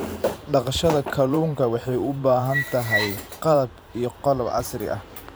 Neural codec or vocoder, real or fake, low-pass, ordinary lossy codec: vocoder, 44.1 kHz, 128 mel bands every 512 samples, BigVGAN v2; fake; none; none